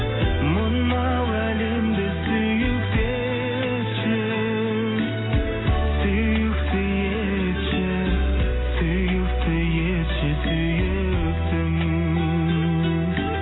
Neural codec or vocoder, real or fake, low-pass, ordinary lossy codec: none; real; 7.2 kHz; AAC, 16 kbps